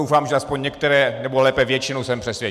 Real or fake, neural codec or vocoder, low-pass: real; none; 14.4 kHz